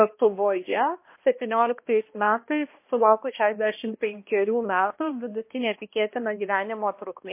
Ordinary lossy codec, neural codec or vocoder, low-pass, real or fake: MP3, 24 kbps; codec, 16 kHz, 1 kbps, X-Codec, HuBERT features, trained on balanced general audio; 3.6 kHz; fake